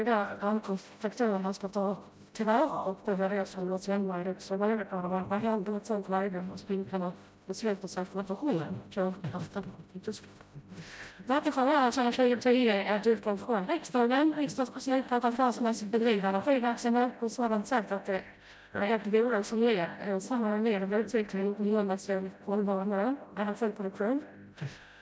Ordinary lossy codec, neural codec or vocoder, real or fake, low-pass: none; codec, 16 kHz, 0.5 kbps, FreqCodec, smaller model; fake; none